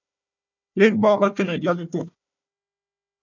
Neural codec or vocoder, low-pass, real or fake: codec, 16 kHz, 1 kbps, FunCodec, trained on Chinese and English, 50 frames a second; 7.2 kHz; fake